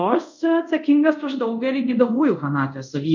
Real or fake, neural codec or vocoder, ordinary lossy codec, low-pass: fake; codec, 24 kHz, 0.5 kbps, DualCodec; MP3, 64 kbps; 7.2 kHz